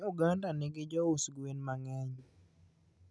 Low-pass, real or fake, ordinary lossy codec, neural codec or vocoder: none; real; none; none